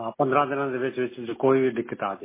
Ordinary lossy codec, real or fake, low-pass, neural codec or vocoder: MP3, 16 kbps; real; 3.6 kHz; none